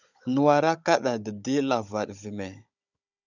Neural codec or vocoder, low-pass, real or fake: codec, 16 kHz, 16 kbps, FunCodec, trained on Chinese and English, 50 frames a second; 7.2 kHz; fake